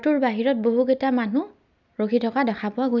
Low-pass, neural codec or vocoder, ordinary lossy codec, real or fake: 7.2 kHz; none; none; real